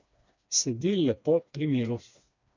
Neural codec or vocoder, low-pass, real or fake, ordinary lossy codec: codec, 16 kHz, 2 kbps, FreqCodec, smaller model; 7.2 kHz; fake; none